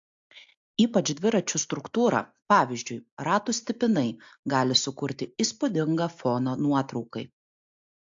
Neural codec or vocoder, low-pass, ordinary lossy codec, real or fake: none; 7.2 kHz; AAC, 64 kbps; real